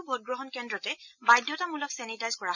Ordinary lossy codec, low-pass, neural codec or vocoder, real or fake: none; 7.2 kHz; none; real